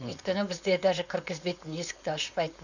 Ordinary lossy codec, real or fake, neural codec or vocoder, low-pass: none; fake; codec, 16 kHz, 4.8 kbps, FACodec; 7.2 kHz